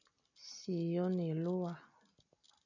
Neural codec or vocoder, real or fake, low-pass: none; real; 7.2 kHz